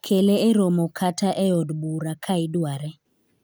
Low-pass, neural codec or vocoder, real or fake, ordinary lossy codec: none; none; real; none